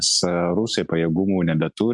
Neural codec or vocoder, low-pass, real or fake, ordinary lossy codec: none; 10.8 kHz; real; MP3, 64 kbps